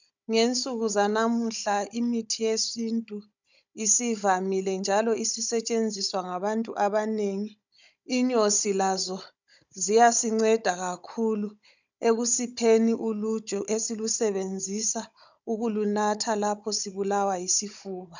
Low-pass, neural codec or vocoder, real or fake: 7.2 kHz; codec, 16 kHz, 16 kbps, FunCodec, trained on Chinese and English, 50 frames a second; fake